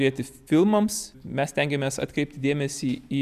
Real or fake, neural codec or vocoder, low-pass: real; none; 14.4 kHz